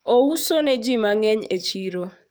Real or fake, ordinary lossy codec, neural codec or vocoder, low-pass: fake; none; codec, 44.1 kHz, 7.8 kbps, DAC; none